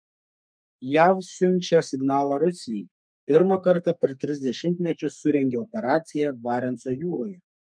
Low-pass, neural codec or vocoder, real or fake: 9.9 kHz; codec, 32 kHz, 1.9 kbps, SNAC; fake